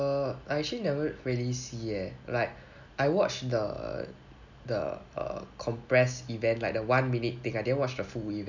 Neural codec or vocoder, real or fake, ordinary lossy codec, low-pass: none; real; none; 7.2 kHz